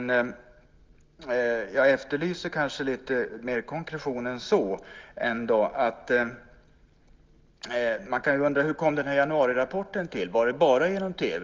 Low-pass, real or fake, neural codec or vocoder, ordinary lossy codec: 7.2 kHz; real; none; Opus, 32 kbps